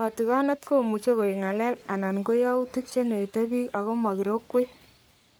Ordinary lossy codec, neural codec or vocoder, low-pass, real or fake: none; codec, 44.1 kHz, 3.4 kbps, Pupu-Codec; none; fake